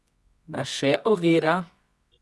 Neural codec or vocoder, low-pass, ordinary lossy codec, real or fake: codec, 24 kHz, 0.9 kbps, WavTokenizer, medium music audio release; none; none; fake